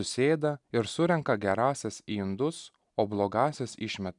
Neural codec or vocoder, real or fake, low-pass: none; real; 10.8 kHz